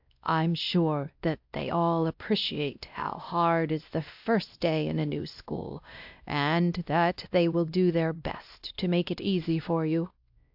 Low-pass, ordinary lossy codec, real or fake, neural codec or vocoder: 5.4 kHz; AAC, 48 kbps; fake; codec, 16 kHz, 1 kbps, X-Codec, WavLM features, trained on Multilingual LibriSpeech